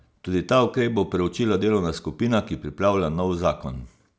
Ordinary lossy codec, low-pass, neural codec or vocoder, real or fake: none; none; none; real